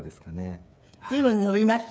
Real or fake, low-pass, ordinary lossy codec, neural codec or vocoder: fake; none; none; codec, 16 kHz, 8 kbps, FreqCodec, smaller model